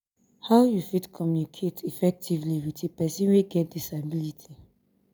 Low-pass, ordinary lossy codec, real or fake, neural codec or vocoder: none; none; real; none